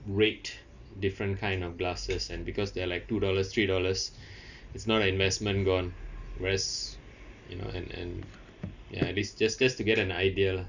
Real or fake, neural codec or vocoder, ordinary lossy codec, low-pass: real; none; none; 7.2 kHz